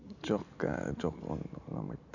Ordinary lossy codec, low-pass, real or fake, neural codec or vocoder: none; 7.2 kHz; real; none